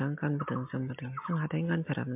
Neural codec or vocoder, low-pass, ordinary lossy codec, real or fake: none; 3.6 kHz; MP3, 32 kbps; real